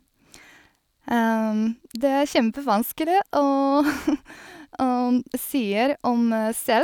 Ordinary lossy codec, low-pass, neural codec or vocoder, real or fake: none; 19.8 kHz; none; real